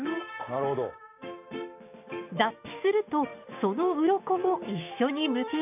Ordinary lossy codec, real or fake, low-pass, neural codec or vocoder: none; fake; 3.6 kHz; codec, 16 kHz, 6 kbps, DAC